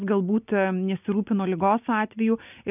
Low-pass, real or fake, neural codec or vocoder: 3.6 kHz; real; none